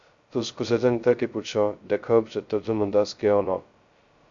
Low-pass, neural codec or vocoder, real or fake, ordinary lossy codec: 7.2 kHz; codec, 16 kHz, 0.2 kbps, FocalCodec; fake; Opus, 64 kbps